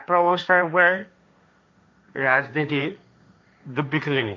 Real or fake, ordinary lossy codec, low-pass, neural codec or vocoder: fake; none; none; codec, 16 kHz, 1.1 kbps, Voila-Tokenizer